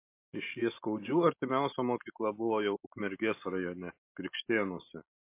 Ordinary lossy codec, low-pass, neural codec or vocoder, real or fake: MP3, 24 kbps; 3.6 kHz; none; real